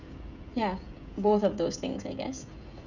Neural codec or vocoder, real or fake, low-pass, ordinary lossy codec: codec, 16 kHz, 16 kbps, FreqCodec, smaller model; fake; 7.2 kHz; none